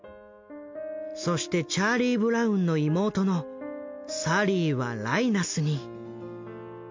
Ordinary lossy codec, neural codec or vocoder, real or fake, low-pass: MP3, 48 kbps; none; real; 7.2 kHz